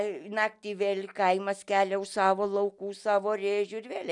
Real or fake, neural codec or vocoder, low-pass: real; none; 10.8 kHz